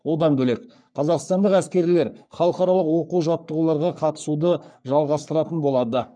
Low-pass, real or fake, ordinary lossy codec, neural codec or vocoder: 9.9 kHz; fake; none; codec, 44.1 kHz, 3.4 kbps, Pupu-Codec